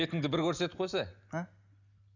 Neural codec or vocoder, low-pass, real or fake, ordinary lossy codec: none; 7.2 kHz; real; none